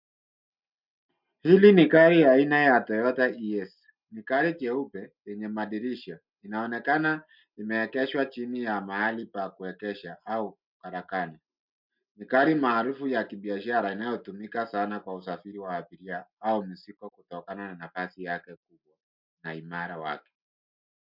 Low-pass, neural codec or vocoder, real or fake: 5.4 kHz; none; real